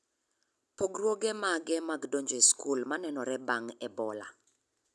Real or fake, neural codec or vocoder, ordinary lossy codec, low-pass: real; none; none; none